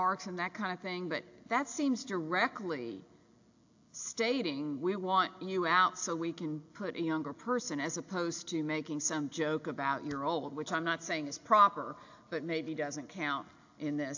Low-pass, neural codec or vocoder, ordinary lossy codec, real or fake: 7.2 kHz; none; AAC, 48 kbps; real